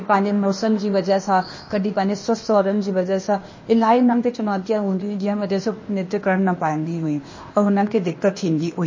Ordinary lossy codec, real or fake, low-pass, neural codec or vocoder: MP3, 32 kbps; fake; 7.2 kHz; codec, 16 kHz, 0.8 kbps, ZipCodec